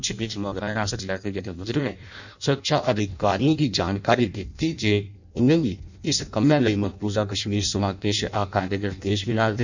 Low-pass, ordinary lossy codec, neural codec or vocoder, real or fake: 7.2 kHz; none; codec, 16 kHz in and 24 kHz out, 0.6 kbps, FireRedTTS-2 codec; fake